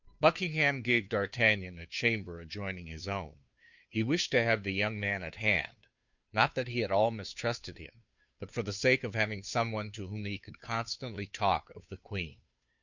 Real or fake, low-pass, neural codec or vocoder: fake; 7.2 kHz; codec, 16 kHz, 2 kbps, FunCodec, trained on Chinese and English, 25 frames a second